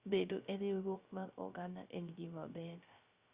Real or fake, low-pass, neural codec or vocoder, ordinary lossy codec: fake; 3.6 kHz; codec, 16 kHz, 0.3 kbps, FocalCodec; Opus, 64 kbps